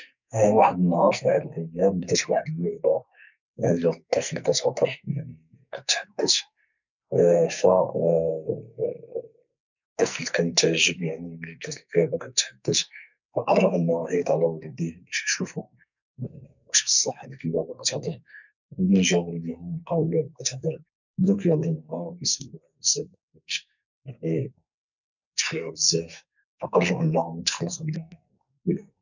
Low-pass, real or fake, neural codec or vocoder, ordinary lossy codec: 7.2 kHz; fake; codec, 44.1 kHz, 2.6 kbps, SNAC; none